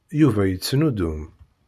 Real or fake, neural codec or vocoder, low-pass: real; none; 14.4 kHz